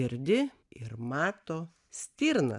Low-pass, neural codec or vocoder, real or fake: 10.8 kHz; none; real